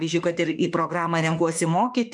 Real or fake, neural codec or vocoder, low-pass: fake; autoencoder, 48 kHz, 32 numbers a frame, DAC-VAE, trained on Japanese speech; 10.8 kHz